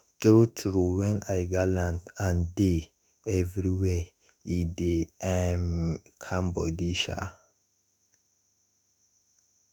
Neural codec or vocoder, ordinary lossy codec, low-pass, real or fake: autoencoder, 48 kHz, 32 numbers a frame, DAC-VAE, trained on Japanese speech; none; none; fake